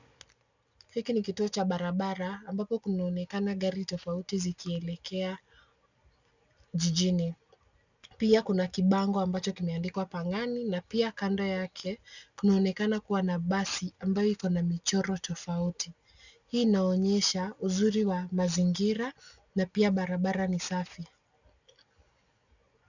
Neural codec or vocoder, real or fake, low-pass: none; real; 7.2 kHz